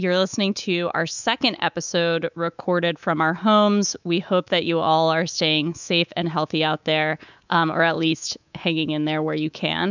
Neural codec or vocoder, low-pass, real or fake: codec, 24 kHz, 3.1 kbps, DualCodec; 7.2 kHz; fake